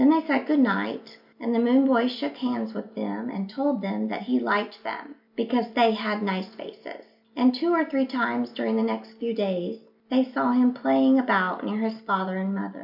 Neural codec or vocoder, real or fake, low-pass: none; real; 5.4 kHz